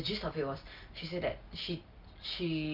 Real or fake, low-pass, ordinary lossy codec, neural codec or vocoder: real; 5.4 kHz; Opus, 24 kbps; none